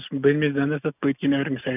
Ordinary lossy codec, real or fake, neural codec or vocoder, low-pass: none; real; none; 3.6 kHz